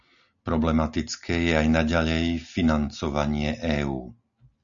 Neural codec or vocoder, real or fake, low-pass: none; real; 7.2 kHz